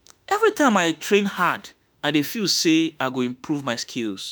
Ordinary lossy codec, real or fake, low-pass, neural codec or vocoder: none; fake; none; autoencoder, 48 kHz, 32 numbers a frame, DAC-VAE, trained on Japanese speech